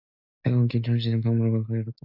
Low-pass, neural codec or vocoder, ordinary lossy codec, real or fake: 5.4 kHz; none; AAC, 48 kbps; real